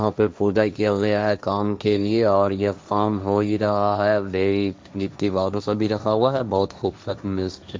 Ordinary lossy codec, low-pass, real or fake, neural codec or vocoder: none; none; fake; codec, 16 kHz, 1.1 kbps, Voila-Tokenizer